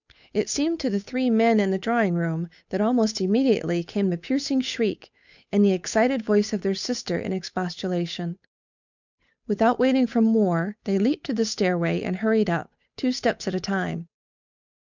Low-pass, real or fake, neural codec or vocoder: 7.2 kHz; fake; codec, 16 kHz, 8 kbps, FunCodec, trained on Chinese and English, 25 frames a second